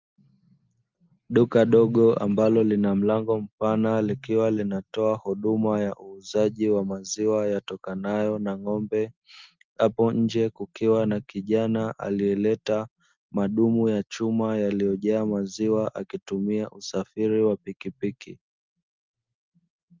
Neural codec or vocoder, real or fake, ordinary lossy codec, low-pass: none; real; Opus, 24 kbps; 7.2 kHz